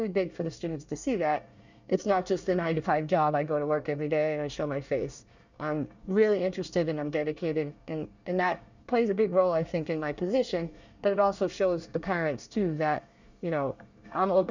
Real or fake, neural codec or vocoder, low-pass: fake; codec, 24 kHz, 1 kbps, SNAC; 7.2 kHz